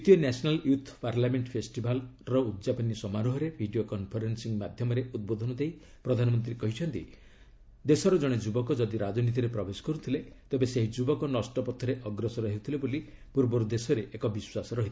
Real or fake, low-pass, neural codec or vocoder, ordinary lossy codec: real; none; none; none